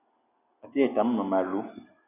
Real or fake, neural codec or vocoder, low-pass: real; none; 3.6 kHz